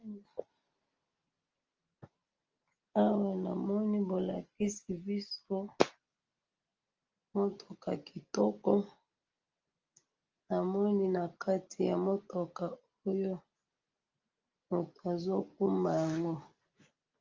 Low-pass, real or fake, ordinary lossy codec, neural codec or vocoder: 7.2 kHz; real; Opus, 24 kbps; none